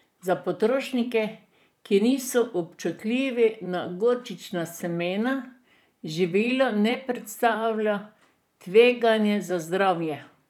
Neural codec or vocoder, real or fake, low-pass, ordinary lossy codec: vocoder, 44.1 kHz, 128 mel bands, Pupu-Vocoder; fake; 19.8 kHz; none